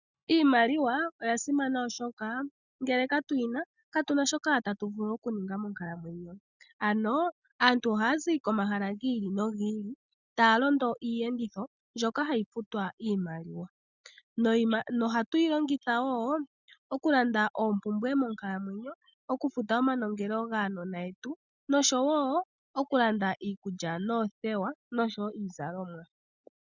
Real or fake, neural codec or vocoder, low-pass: real; none; 7.2 kHz